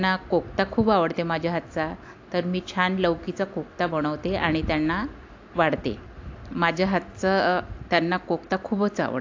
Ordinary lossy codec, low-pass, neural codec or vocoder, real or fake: AAC, 48 kbps; 7.2 kHz; none; real